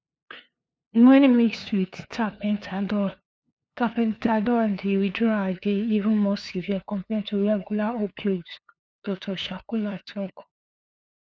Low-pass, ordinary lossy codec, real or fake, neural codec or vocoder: none; none; fake; codec, 16 kHz, 2 kbps, FunCodec, trained on LibriTTS, 25 frames a second